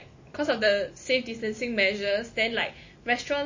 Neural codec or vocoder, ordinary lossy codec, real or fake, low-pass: none; MP3, 32 kbps; real; 7.2 kHz